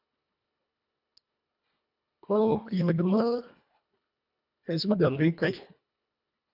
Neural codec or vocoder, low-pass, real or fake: codec, 24 kHz, 1.5 kbps, HILCodec; 5.4 kHz; fake